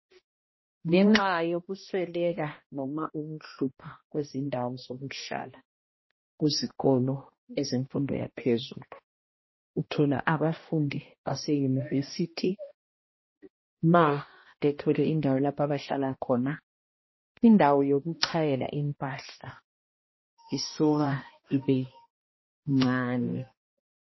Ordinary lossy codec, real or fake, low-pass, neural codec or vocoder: MP3, 24 kbps; fake; 7.2 kHz; codec, 16 kHz, 1 kbps, X-Codec, HuBERT features, trained on balanced general audio